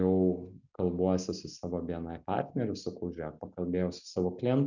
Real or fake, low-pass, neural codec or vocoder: real; 7.2 kHz; none